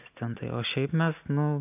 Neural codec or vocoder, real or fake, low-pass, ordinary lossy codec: none; real; 3.6 kHz; AAC, 32 kbps